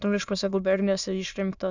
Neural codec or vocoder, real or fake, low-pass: autoencoder, 22.05 kHz, a latent of 192 numbers a frame, VITS, trained on many speakers; fake; 7.2 kHz